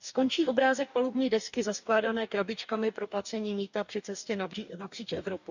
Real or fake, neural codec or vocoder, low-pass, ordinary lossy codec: fake; codec, 44.1 kHz, 2.6 kbps, DAC; 7.2 kHz; none